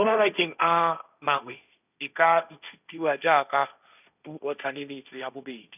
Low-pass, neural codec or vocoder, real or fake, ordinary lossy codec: 3.6 kHz; codec, 16 kHz, 1.1 kbps, Voila-Tokenizer; fake; none